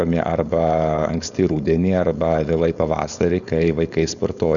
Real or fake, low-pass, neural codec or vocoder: fake; 7.2 kHz; codec, 16 kHz, 4.8 kbps, FACodec